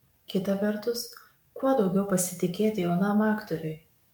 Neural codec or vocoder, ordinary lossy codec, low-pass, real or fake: codec, 44.1 kHz, 7.8 kbps, DAC; MP3, 96 kbps; 19.8 kHz; fake